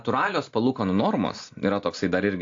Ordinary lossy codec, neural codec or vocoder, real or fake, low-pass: AAC, 48 kbps; none; real; 7.2 kHz